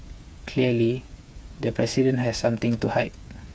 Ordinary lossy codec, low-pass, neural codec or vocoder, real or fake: none; none; codec, 16 kHz, 8 kbps, FreqCodec, smaller model; fake